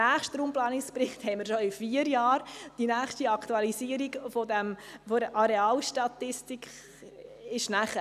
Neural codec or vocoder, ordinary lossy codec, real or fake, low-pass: none; none; real; 14.4 kHz